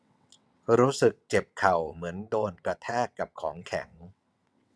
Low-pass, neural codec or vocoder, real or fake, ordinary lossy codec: none; vocoder, 22.05 kHz, 80 mel bands, WaveNeXt; fake; none